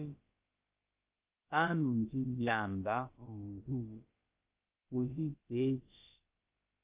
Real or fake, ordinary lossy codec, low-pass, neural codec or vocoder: fake; Opus, 32 kbps; 3.6 kHz; codec, 16 kHz, about 1 kbps, DyCAST, with the encoder's durations